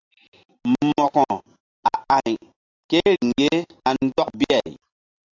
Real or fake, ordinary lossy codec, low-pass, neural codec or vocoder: real; AAC, 48 kbps; 7.2 kHz; none